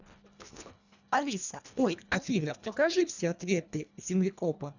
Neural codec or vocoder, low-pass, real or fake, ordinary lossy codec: codec, 24 kHz, 1.5 kbps, HILCodec; 7.2 kHz; fake; none